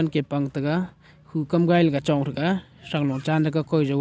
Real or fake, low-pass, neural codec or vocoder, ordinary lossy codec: real; none; none; none